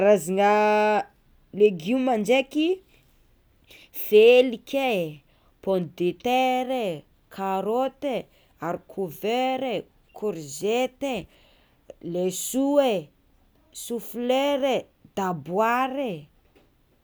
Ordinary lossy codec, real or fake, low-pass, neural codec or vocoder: none; real; none; none